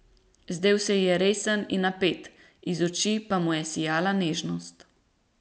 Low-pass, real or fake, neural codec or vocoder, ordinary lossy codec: none; real; none; none